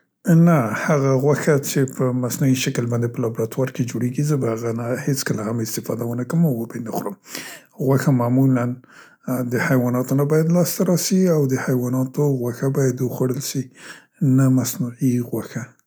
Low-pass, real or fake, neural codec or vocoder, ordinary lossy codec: none; real; none; none